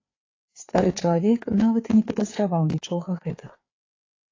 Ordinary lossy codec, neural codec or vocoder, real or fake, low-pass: AAC, 32 kbps; codec, 16 kHz, 4 kbps, FreqCodec, larger model; fake; 7.2 kHz